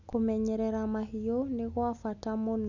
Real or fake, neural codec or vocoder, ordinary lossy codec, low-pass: real; none; none; 7.2 kHz